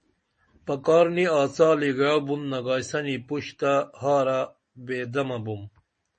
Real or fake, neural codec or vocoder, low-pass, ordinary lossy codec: real; none; 10.8 kHz; MP3, 32 kbps